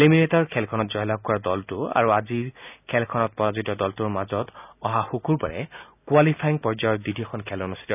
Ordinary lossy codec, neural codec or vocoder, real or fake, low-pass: none; none; real; 3.6 kHz